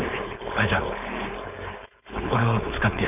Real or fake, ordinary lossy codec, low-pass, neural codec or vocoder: fake; none; 3.6 kHz; codec, 16 kHz, 4.8 kbps, FACodec